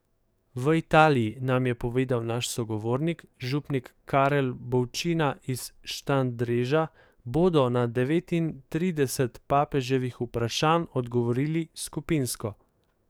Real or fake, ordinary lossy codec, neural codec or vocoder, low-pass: fake; none; codec, 44.1 kHz, 7.8 kbps, DAC; none